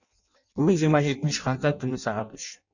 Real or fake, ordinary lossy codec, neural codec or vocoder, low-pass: fake; none; codec, 16 kHz in and 24 kHz out, 0.6 kbps, FireRedTTS-2 codec; 7.2 kHz